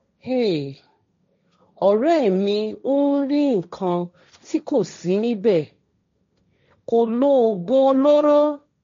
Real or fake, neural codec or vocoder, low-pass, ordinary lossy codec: fake; codec, 16 kHz, 1.1 kbps, Voila-Tokenizer; 7.2 kHz; MP3, 48 kbps